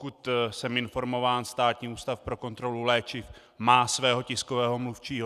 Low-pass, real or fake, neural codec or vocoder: 14.4 kHz; real; none